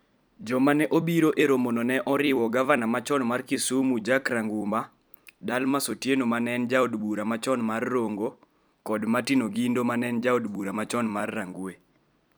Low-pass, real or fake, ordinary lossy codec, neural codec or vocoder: none; fake; none; vocoder, 44.1 kHz, 128 mel bands every 256 samples, BigVGAN v2